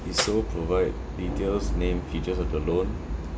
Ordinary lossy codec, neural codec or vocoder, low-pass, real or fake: none; none; none; real